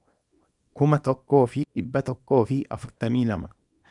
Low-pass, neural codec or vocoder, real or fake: 10.8 kHz; codec, 24 kHz, 0.9 kbps, WavTokenizer, small release; fake